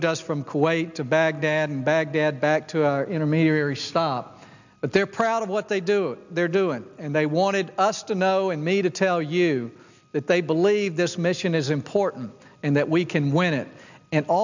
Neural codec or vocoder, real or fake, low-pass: none; real; 7.2 kHz